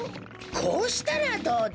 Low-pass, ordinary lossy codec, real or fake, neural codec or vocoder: none; none; real; none